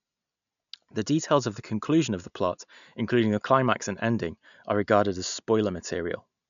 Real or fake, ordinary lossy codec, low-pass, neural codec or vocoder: real; none; 7.2 kHz; none